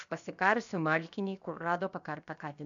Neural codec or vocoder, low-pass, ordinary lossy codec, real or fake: codec, 16 kHz, 0.7 kbps, FocalCodec; 7.2 kHz; MP3, 96 kbps; fake